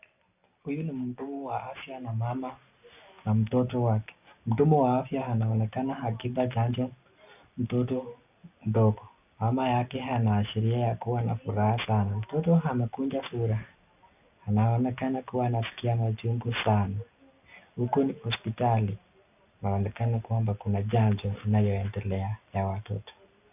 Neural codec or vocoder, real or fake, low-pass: none; real; 3.6 kHz